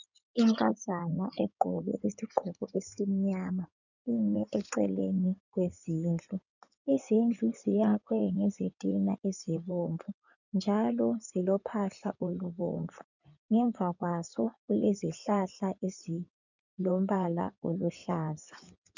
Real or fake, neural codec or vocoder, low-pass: fake; codec, 16 kHz in and 24 kHz out, 2.2 kbps, FireRedTTS-2 codec; 7.2 kHz